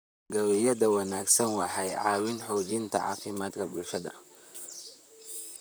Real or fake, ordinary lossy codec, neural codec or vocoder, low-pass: fake; none; vocoder, 44.1 kHz, 128 mel bands, Pupu-Vocoder; none